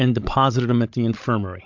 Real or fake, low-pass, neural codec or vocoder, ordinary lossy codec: fake; 7.2 kHz; codec, 16 kHz, 16 kbps, FreqCodec, larger model; MP3, 64 kbps